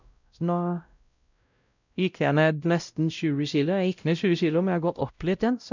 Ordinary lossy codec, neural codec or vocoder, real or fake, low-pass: none; codec, 16 kHz, 0.5 kbps, X-Codec, WavLM features, trained on Multilingual LibriSpeech; fake; 7.2 kHz